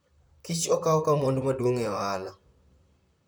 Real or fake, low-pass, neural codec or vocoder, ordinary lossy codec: fake; none; vocoder, 44.1 kHz, 128 mel bands, Pupu-Vocoder; none